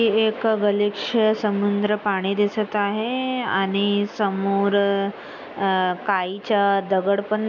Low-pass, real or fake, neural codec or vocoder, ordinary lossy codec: 7.2 kHz; real; none; none